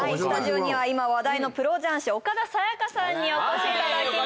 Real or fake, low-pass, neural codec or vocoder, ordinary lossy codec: real; none; none; none